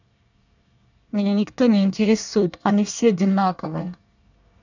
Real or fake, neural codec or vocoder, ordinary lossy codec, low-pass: fake; codec, 24 kHz, 1 kbps, SNAC; none; 7.2 kHz